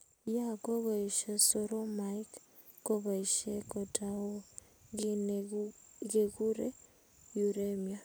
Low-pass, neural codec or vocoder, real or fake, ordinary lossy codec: none; none; real; none